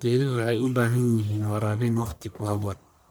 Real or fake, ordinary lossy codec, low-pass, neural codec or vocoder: fake; none; none; codec, 44.1 kHz, 1.7 kbps, Pupu-Codec